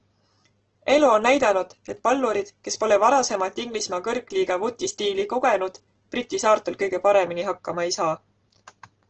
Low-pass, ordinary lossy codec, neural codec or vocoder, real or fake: 7.2 kHz; Opus, 16 kbps; none; real